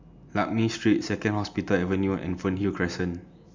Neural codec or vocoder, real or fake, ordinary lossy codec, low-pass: none; real; MP3, 64 kbps; 7.2 kHz